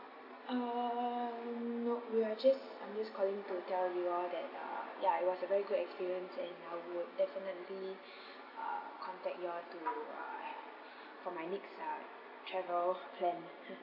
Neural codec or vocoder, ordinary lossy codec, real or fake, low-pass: none; none; real; 5.4 kHz